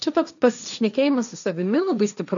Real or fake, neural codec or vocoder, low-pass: fake; codec, 16 kHz, 1.1 kbps, Voila-Tokenizer; 7.2 kHz